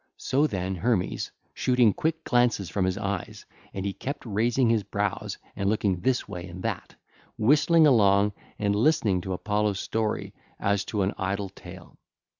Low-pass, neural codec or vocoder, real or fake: 7.2 kHz; none; real